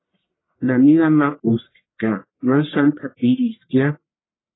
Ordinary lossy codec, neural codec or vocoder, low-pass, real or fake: AAC, 16 kbps; codec, 44.1 kHz, 1.7 kbps, Pupu-Codec; 7.2 kHz; fake